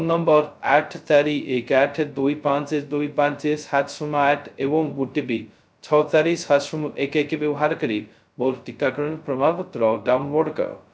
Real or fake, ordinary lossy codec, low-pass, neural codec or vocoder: fake; none; none; codec, 16 kHz, 0.2 kbps, FocalCodec